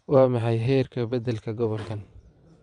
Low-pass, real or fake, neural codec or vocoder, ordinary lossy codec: 9.9 kHz; fake; vocoder, 22.05 kHz, 80 mel bands, WaveNeXt; none